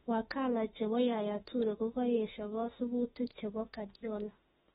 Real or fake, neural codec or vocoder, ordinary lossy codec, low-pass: fake; autoencoder, 48 kHz, 32 numbers a frame, DAC-VAE, trained on Japanese speech; AAC, 16 kbps; 19.8 kHz